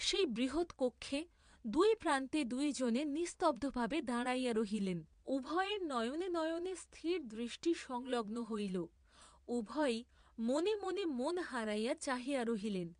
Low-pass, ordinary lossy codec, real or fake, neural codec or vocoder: 9.9 kHz; MP3, 64 kbps; fake; vocoder, 22.05 kHz, 80 mel bands, Vocos